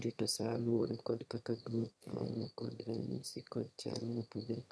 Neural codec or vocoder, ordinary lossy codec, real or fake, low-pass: autoencoder, 22.05 kHz, a latent of 192 numbers a frame, VITS, trained on one speaker; none; fake; none